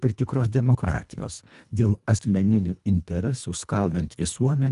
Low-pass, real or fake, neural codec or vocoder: 10.8 kHz; fake; codec, 24 kHz, 1.5 kbps, HILCodec